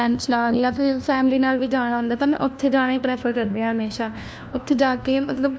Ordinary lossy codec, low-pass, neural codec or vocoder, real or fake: none; none; codec, 16 kHz, 1 kbps, FunCodec, trained on LibriTTS, 50 frames a second; fake